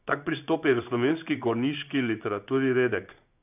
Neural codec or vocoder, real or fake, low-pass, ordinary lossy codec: codec, 16 kHz in and 24 kHz out, 1 kbps, XY-Tokenizer; fake; 3.6 kHz; none